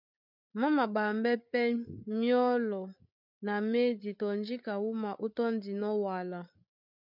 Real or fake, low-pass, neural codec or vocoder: fake; 5.4 kHz; codec, 16 kHz, 8 kbps, FreqCodec, larger model